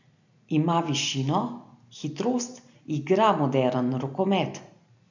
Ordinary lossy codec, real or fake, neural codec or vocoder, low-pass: none; real; none; 7.2 kHz